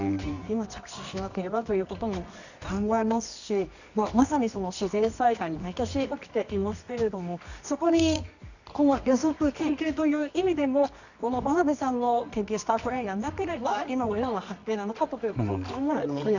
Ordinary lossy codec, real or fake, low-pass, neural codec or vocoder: none; fake; 7.2 kHz; codec, 24 kHz, 0.9 kbps, WavTokenizer, medium music audio release